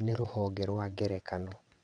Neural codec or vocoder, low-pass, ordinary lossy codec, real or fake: vocoder, 22.05 kHz, 80 mel bands, WaveNeXt; 9.9 kHz; none; fake